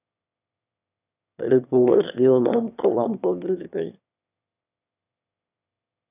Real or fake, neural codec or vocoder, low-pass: fake; autoencoder, 22.05 kHz, a latent of 192 numbers a frame, VITS, trained on one speaker; 3.6 kHz